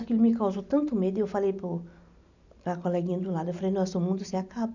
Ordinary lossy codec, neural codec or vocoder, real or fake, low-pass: none; none; real; 7.2 kHz